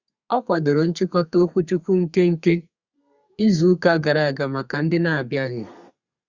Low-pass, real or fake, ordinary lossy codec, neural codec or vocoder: 7.2 kHz; fake; Opus, 64 kbps; codec, 32 kHz, 1.9 kbps, SNAC